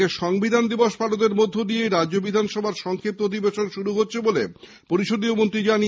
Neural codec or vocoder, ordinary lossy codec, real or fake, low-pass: none; none; real; none